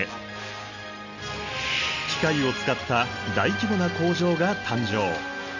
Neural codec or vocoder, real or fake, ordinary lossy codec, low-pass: none; real; none; 7.2 kHz